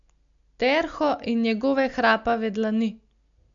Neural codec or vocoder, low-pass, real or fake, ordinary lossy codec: none; 7.2 kHz; real; MP3, 64 kbps